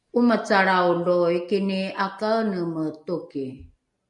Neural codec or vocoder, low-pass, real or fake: none; 10.8 kHz; real